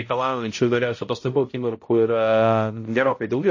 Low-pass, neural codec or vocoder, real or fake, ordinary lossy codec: 7.2 kHz; codec, 16 kHz, 0.5 kbps, X-Codec, HuBERT features, trained on balanced general audio; fake; MP3, 32 kbps